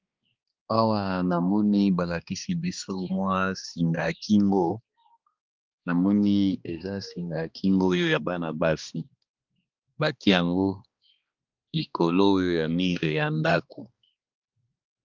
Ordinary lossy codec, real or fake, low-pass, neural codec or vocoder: Opus, 32 kbps; fake; 7.2 kHz; codec, 16 kHz, 2 kbps, X-Codec, HuBERT features, trained on balanced general audio